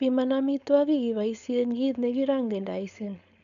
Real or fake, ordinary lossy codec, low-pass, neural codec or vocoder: fake; none; 7.2 kHz; codec, 16 kHz, 4.8 kbps, FACodec